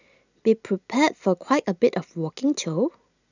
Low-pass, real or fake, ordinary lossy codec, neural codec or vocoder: 7.2 kHz; real; none; none